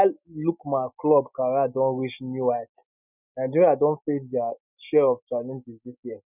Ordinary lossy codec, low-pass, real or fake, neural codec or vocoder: none; 3.6 kHz; real; none